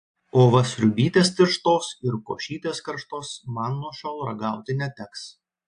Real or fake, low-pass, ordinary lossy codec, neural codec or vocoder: real; 9.9 kHz; AAC, 64 kbps; none